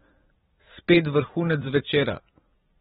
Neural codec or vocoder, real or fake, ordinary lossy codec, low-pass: vocoder, 44.1 kHz, 128 mel bands every 256 samples, BigVGAN v2; fake; AAC, 16 kbps; 19.8 kHz